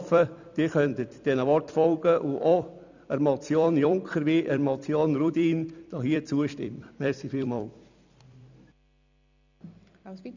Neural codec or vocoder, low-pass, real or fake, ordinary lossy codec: vocoder, 44.1 kHz, 128 mel bands every 256 samples, BigVGAN v2; 7.2 kHz; fake; none